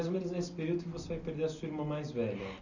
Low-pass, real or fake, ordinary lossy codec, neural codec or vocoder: 7.2 kHz; real; none; none